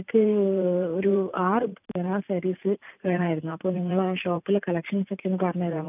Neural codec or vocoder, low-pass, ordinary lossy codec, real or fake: vocoder, 44.1 kHz, 128 mel bands every 512 samples, BigVGAN v2; 3.6 kHz; none; fake